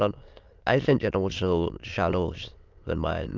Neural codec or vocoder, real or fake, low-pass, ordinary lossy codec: autoencoder, 22.05 kHz, a latent of 192 numbers a frame, VITS, trained on many speakers; fake; 7.2 kHz; Opus, 24 kbps